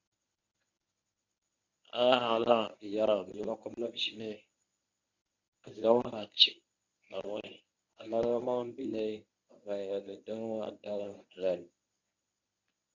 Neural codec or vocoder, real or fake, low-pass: codec, 24 kHz, 0.9 kbps, WavTokenizer, medium speech release version 1; fake; 7.2 kHz